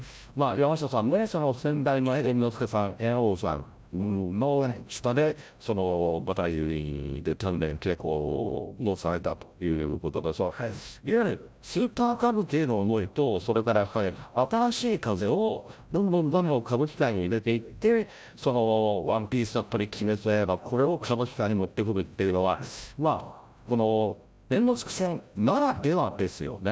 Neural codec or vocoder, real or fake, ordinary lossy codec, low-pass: codec, 16 kHz, 0.5 kbps, FreqCodec, larger model; fake; none; none